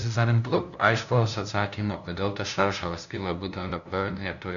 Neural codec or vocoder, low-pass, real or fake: codec, 16 kHz, 0.5 kbps, FunCodec, trained on LibriTTS, 25 frames a second; 7.2 kHz; fake